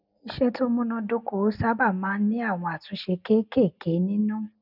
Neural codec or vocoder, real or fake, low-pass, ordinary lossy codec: none; real; 5.4 kHz; none